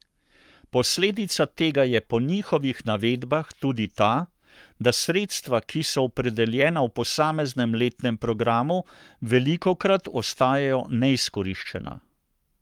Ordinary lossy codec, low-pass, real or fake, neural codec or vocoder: Opus, 32 kbps; 19.8 kHz; fake; codec, 44.1 kHz, 7.8 kbps, Pupu-Codec